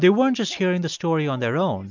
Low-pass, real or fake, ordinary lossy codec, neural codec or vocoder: 7.2 kHz; real; MP3, 64 kbps; none